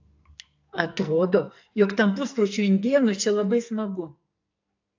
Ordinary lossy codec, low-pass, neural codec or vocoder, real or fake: AAC, 48 kbps; 7.2 kHz; codec, 44.1 kHz, 2.6 kbps, SNAC; fake